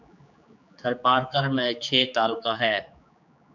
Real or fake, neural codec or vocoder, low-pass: fake; codec, 16 kHz, 4 kbps, X-Codec, HuBERT features, trained on general audio; 7.2 kHz